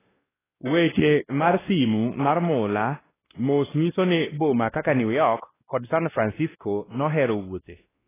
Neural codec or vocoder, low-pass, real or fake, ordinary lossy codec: codec, 16 kHz, 1 kbps, X-Codec, WavLM features, trained on Multilingual LibriSpeech; 3.6 kHz; fake; AAC, 16 kbps